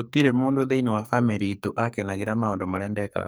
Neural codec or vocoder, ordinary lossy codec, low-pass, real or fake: codec, 44.1 kHz, 2.6 kbps, SNAC; none; none; fake